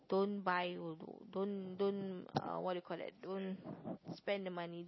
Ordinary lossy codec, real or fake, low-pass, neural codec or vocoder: MP3, 24 kbps; real; 7.2 kHz; none